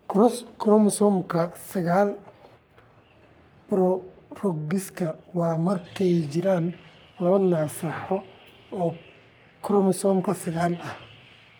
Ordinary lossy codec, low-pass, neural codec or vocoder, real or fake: none; none; codec, 44.1 kHz, 3.4 kbps, Pupu-Codec; fake